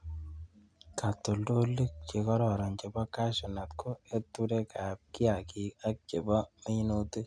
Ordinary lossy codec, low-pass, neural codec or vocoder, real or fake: none; 9.9 kHz; none; real